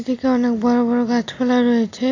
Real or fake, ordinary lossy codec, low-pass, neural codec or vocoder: real; AAC, 32 kbps; 7.2 kHz; none